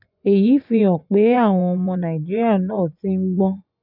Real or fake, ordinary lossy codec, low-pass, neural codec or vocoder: fake; none; 5.4 kHz; vocoder, 24 kHz, 100 mel bands, Vocos